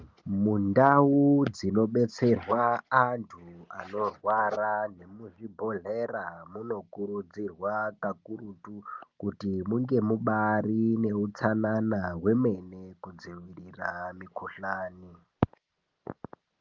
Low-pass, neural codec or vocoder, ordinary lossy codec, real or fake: 7.2 kHz; none; Opus, 24 kbps; real